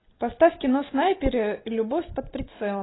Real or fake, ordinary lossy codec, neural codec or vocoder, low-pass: real; AAC, 16 kbps; none; 7.2 kHz